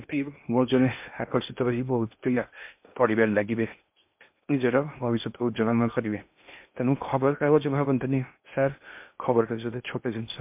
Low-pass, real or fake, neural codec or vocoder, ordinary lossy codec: 3.6 kHz; fake; codec, 16 kHz in and 24 kHz out, 0.6 kbps, FocalCodec, streaming, 2048 codes; MP3, 32 kbps